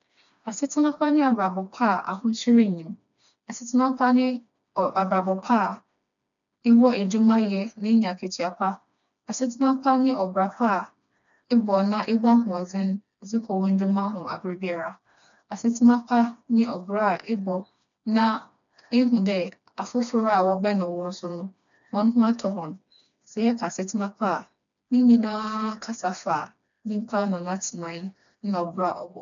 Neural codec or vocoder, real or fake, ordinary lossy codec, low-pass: codec, 16 kHz, 2 kbps, FreqCodec, smaller model; fake; none; 7.2 kHz